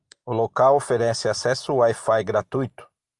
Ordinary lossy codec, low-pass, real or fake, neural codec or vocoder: Opus, 32 kbps; 10.8 kHz; real; none